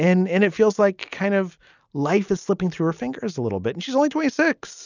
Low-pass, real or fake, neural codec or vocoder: 7.2 kHz; real; none